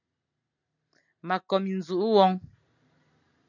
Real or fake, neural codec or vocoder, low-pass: real; none; 7.2 kHz